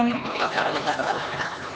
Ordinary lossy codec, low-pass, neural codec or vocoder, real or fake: none; none; codec, 16 kHz, 2 kbps, X-Codec, HuBERT features, trained on LibriSpeech; fake